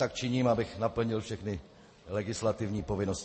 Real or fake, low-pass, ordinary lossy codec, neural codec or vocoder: real; 9.9 kHz; MP3, 32 kbps; none